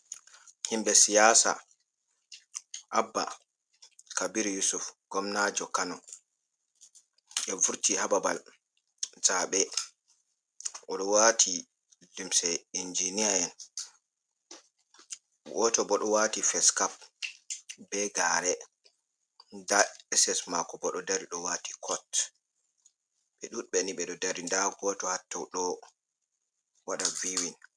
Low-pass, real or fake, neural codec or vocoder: 9.9 kHz; real; none